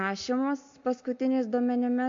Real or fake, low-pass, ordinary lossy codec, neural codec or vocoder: real; 7.2 kHz; MP3, 48 kbps; none